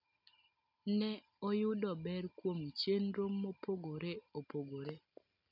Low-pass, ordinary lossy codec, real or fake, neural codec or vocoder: 5.4 kHz; none; real; none